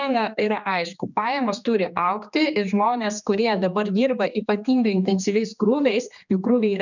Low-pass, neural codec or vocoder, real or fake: 7.2 kHz; codec, 16 kHz, 2 kbps, X-Codec, HuBERT features, trained on general audio; fake